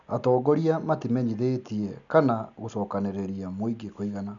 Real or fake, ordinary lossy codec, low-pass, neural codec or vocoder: real; none; 7.2 kHz; none